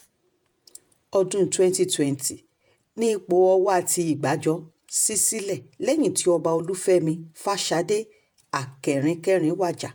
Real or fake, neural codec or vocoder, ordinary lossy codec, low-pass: fake; vocoder, 48 kHz, 128 mel bands, Vocos; none; none